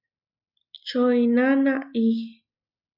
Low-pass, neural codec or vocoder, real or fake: 5.4 kHz; none; real